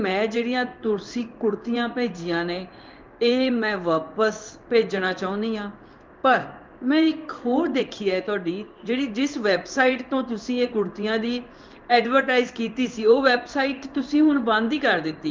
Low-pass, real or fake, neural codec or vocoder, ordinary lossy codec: 7.2 kHz; fake; codec, 16 kHz in and 24 kHz out, 1 kbps, XY-Tokenizer; Opus, 24 kbps